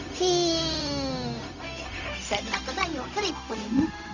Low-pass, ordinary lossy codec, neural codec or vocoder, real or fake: 7.2 kHz; none; codec, 16 kHz, 0.4 kbps, LongCat-Audio-Codec; fake